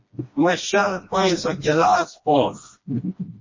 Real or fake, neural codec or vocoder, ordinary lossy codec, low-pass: fake; codec, 16 kHz, 1 kbps, FreqCodec, smaller model; MP3, 32 kbps; 7.2 kHz